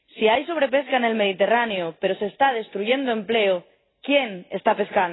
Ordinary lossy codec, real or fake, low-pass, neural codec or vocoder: AAC, 16 kbps; real; 7.2 kHz; none